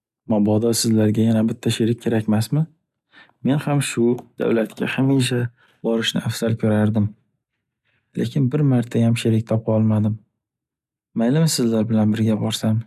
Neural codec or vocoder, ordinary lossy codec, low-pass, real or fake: none; none; 14.4 kHz; real